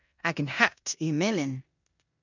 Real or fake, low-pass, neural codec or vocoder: fake; 7.2 kHz; codec, 16 kHz in and 24 kHz out, 0.9 kbps, LongCat-Audio-Codec, four codebook decoder